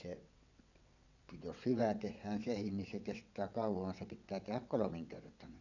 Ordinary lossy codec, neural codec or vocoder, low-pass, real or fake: none; vocoder, 22.05 kHz, 80 mel bands, WaveNeXt; 7.2 kHz; fake